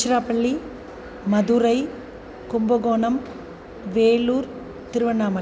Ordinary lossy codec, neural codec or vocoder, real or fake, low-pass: none; none; real; none